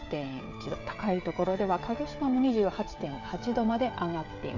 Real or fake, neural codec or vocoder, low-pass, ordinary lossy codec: fake; codec, 16 kHz, 16 kbps, FreqCodec, smaller model; 7.2 kHz; none